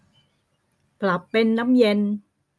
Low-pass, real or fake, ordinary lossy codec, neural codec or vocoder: none; real; none; none